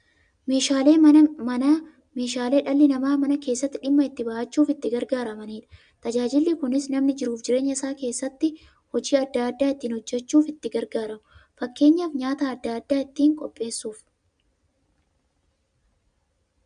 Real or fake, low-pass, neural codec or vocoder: real; 9.9 kHz; none